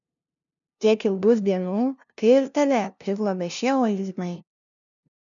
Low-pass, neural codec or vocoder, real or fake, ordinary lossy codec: 7.2 kHz; codec, 16 kHz, 0.5 kbps, FunCodec, trained on LibriTTS, 25 frames a second; fake; MP3, 96 kbps